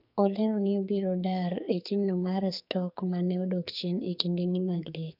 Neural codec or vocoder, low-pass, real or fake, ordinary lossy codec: codec, 44.1 kHz, 2.6 kbps, SNAC; 5.4 kHz; fake; none